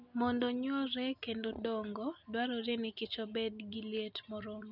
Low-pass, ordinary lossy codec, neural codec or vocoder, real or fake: 5.4 kHz; none; none; real